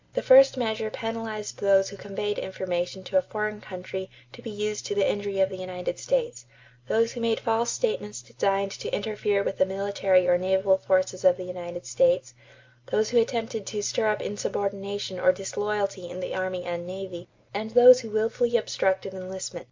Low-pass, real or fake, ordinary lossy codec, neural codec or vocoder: 7.2 kHz; real; MP3, 64 kbps; none